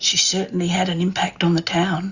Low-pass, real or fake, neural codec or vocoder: 7.2 kHz; real; none